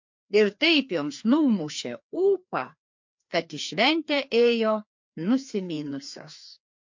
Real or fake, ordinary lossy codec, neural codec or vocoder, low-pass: fake; MP3, 48 kbps; codec, 16 kHz, 2 kbps, FreqCodec, larger model; 7.2 kHz